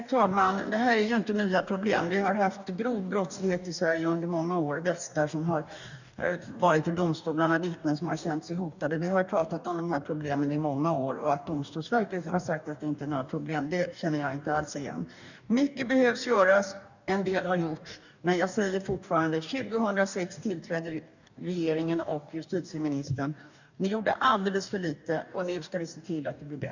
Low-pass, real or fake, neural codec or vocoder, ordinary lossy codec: 7.2 kHz; fake; codec, 44.1 kHz, 2.6 kbps, DAC; none